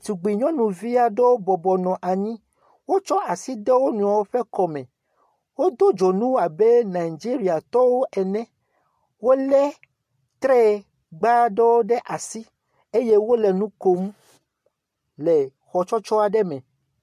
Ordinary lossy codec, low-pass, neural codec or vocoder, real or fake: MP3, 64 kbps; 14.4 kHz; none; real